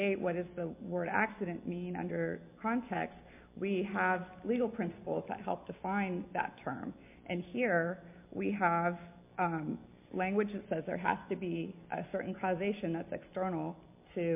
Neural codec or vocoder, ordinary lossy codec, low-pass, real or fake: none; MP3, 32 kbps; 3.6 kHz; real